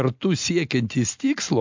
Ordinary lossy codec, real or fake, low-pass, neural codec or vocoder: MP3, 64 kbps; real; 7.2 kHz; none